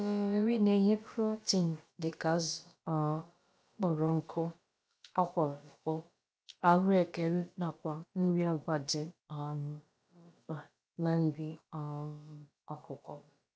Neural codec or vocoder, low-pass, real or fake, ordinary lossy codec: codec, 16 kHz, about 1 kbps, DyCAST, with the encoder's durations; none; fake; none